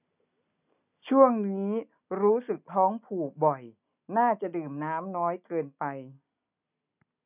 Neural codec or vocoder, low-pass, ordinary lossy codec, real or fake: none; 3.6 kHz; none; real